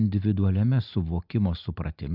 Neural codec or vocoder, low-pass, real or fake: none; 5.4 kHz; real